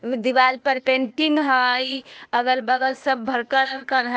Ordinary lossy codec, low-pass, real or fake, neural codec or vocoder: none; none; fake; codec, 16 kHz, 0.8 kbps, ZipCodec